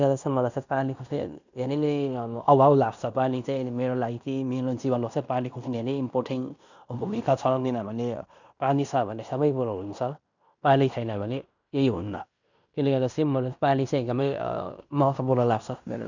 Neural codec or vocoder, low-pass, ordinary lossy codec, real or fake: codec, 16 kHz in and 24 kHz out, 0.9 kbps, LongCat-Audio-Codec, fine tuned four codebook decoder; 7.2 kHz; none; fake